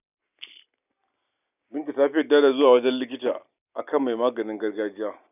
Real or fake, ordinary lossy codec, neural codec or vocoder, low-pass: real; none; none; 3.6 kHz